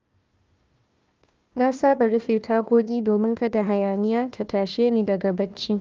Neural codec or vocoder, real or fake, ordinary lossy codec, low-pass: codec, 16 kHz, 1 kbps, FunCodec, trained on Chinese and English, 50 frames a second; fake; Opus, 32 kbps; 7.2 kHz